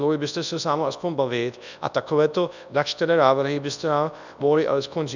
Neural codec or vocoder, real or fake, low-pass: codec, 24 kHz, 0.9 kbps, WavTokenizer, large speech release; fake; 7.2 kHz